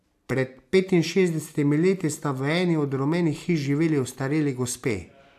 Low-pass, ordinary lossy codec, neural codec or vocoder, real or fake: 14.4 kHz; AAC, 96 kbps; none; real